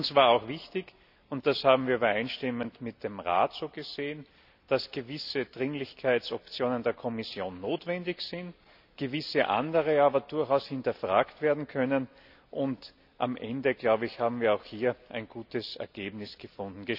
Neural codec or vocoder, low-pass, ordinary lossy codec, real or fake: none; 5.4 kHz; none; real